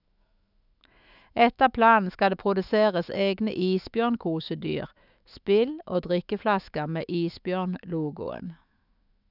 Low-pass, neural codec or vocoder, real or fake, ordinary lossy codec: 5.4 kHz; autoencoder, 48 kHz, 128 numbers a frame, DAC-VAE, trained on Japanese speech; fake; none